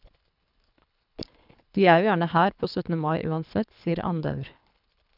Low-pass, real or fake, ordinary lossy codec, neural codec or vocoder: 5.4 kHz; fake; none; codec, 24 kHz, 3 kbps, HILCodec